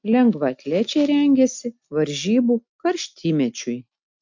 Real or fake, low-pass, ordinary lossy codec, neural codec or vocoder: real; 7.2 kHz; MP3, 64 kbps; none